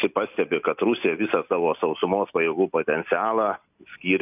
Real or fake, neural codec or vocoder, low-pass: real; none; 3.6 kHz